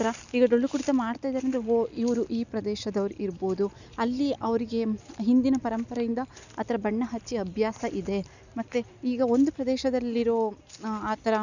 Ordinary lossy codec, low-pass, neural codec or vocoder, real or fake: none; 7.2 kHz; none; real